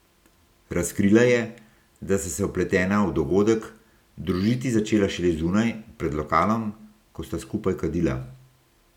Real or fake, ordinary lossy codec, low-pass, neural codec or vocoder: real; none; 19.8 kHz; none